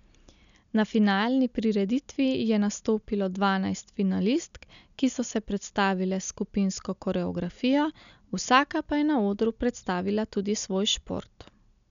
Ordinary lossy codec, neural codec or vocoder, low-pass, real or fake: none; none; 7.2 kHz; real